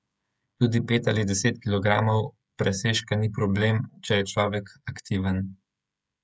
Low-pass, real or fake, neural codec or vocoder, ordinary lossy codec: none; fake; codec, 16 kHz, 16 kbps, FreqCodec, smaller model; none